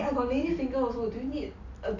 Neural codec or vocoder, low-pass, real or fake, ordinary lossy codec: none; 7.2 kHz; real; none